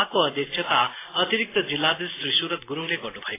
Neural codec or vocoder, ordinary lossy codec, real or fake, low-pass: none; AAC, 16 kbps; real; 3.6 kHz